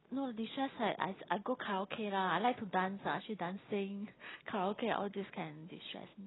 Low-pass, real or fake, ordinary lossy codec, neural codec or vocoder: 7.2 kHz; real; AAC, 16 kbps; none